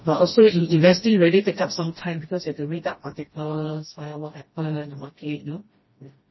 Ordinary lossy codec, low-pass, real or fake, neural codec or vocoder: MP3, 24 kbps; 7.2 kHz; fake; codec, 16 kHz, 1 kbps, FreqCodec, smaller model